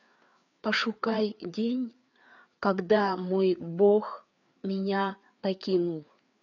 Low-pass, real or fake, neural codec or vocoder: 7.2 kHz; fake; codec, 16 kHz, 4 kbps, FreqCodec, larger model